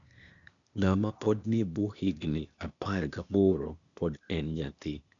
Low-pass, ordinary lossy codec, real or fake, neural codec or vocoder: 7.2 kHz; none; fake; codec, 16 kHz, 0.8 kbps, ZipCodec